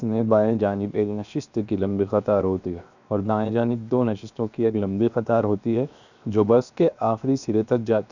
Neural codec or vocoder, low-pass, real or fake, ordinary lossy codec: codec, 16 kHz, 0.7 kbps, FocalCodec; 7.2 kHz; fake; none